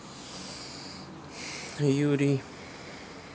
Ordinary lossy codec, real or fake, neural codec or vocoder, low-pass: none; real; none; none